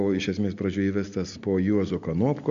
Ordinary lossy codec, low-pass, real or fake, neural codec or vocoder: MP3, 64 kbps; 7.2 kHz; real; none